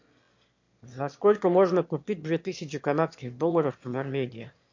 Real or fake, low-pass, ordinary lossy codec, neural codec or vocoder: fake; 7.2 kHz; MP3, 48 kbps; autoencoder, 22.05 kHz, a latent of 192 numbers a frame, VITS, trained on one speaker